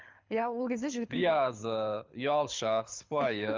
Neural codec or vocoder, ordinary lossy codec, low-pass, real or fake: codec, 24 kHz, 6 kbps, HILCodec; Opus, 24 kbps; 7.2 kHz; fake